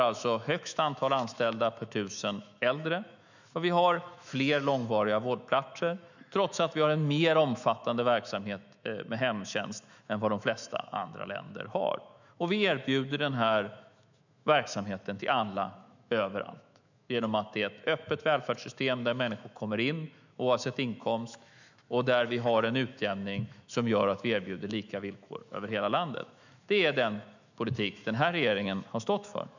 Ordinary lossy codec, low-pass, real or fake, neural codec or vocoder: none; 7.2 kHz; fake; autoencoder, 48 kHz, 128 numbers a frame, DAC-VAE, trained on Japanese speech